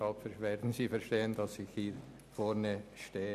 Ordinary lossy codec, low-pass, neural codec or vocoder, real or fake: none; 14.4 kHz; none; real